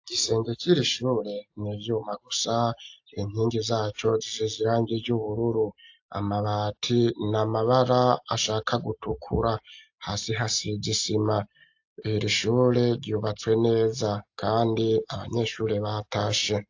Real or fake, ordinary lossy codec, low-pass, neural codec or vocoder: real; AAC, 48 kbps; 7.2 kHz; none